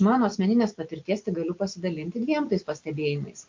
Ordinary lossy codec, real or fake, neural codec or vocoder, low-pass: MP3, 48 kbps; real; none; 7.2 kHz